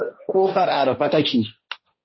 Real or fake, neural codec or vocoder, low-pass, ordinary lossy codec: fake; codec, 16 kHz, 1.1 kbps, Voila-Tokenizer; 7.2 kHz; MP3, 24 kbps